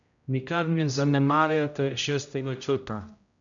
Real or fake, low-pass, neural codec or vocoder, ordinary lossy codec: fake; 7.2 kHz; codec, 16 kHz, 0.5 kbps, X-Codec, HuBERT features, trained on general audio; none